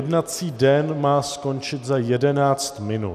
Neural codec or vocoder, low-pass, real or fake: none; 14.4 kHz; real